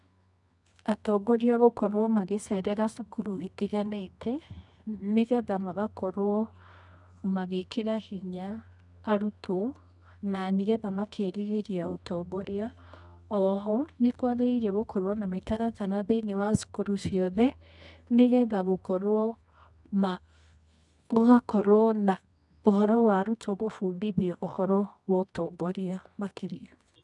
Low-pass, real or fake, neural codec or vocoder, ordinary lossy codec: 10.8 kHz; fake; codec, 24 kHz, 0.9 kbps, WavTokenizer, medium music audio release; none